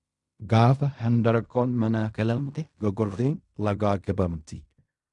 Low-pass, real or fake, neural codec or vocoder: 10.8 kHz; fake; codec, 16 kHz in and 24 kHz out, 0.4 kbps, LongCat-Audio-Codec, fine tuned four codebook decoder